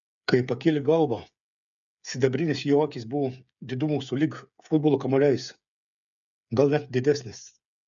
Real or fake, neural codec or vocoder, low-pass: fake; codec, 16 kHz, 8 kbps, FreqCodec, smaller model; 7.2 kHz